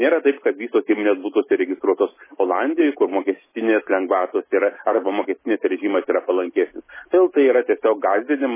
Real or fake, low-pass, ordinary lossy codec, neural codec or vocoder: real; 3.6 kHz; MP3, 16 kbps; none